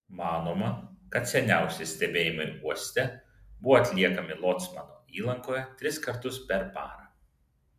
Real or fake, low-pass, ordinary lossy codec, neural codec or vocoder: real; 14.4 kHz; MP3, 96 kbps; none